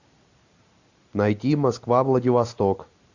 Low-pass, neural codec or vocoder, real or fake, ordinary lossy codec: 7.2 kHz; none; real; AAC, 48 kbps